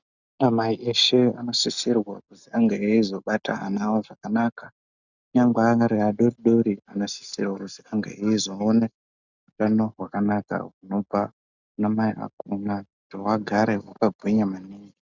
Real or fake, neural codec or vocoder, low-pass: real; none; 7.2 kHz